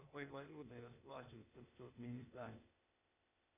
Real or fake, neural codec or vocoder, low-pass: fake; codec, 16 kHz, 0.8 kbps, ZipCodec; 3.6 kHz